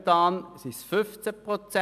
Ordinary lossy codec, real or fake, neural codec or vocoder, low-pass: none; real; none; 14.4 kHz